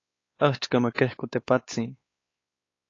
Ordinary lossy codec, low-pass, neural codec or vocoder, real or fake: AAC, 32 kbps; 7.2 kHz; codec, 16 kHz, 4 kbps, X-Codec, WavLM features, trained on Multilingual LibriSpeech; fake